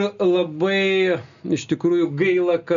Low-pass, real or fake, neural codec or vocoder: 7.2 kHz; real; none